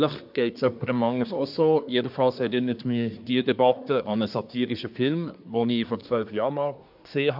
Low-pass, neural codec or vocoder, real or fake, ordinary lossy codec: 5.4 kHz; codec, 24 kHz, 1 kbps, SNAC; fake; none